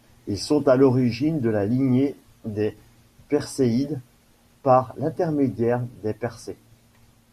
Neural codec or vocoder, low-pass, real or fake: none; 14.4 kHz; real